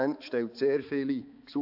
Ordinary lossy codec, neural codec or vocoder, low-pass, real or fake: AAC, 48 kbps; codec, 16 kHz, 4 kbps, X-Codec, HuBERT features, trained on balanced general audio; 5.4 kHz; fake